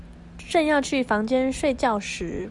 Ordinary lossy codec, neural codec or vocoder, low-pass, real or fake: Opus, 64 kbps; none; 10.8 kHz; real